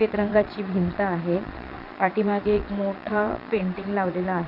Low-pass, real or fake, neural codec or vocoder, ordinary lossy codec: 5.4 kHz; fake; vocoder, 22.05 kHz, 80 mel bands, Vocos; none